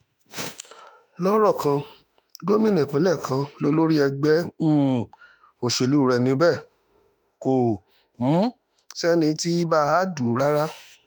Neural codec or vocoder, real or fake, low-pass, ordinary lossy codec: autoencoder, 48 kHz, 32 numbers a frame, DAC-VAE, trained on Japanese speech; fake; none; none